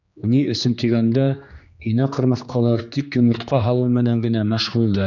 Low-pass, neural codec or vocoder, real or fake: 7.2 kHz; codec, 16 kHz, 2 kbps, X-Codec, HuBERT features, trained on general audio; fake